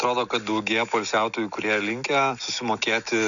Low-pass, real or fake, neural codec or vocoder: 7.2 kHz; real; none